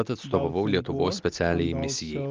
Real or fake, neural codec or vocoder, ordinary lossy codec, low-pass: real; none; Opus, 32 kbps; 7.2 kHz